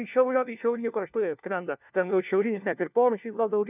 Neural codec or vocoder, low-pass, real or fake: codec, 16 kHz, 1 kbps, FunCodec, trained on LibriTTS, 50 frames a second; 3.6 kHz; fake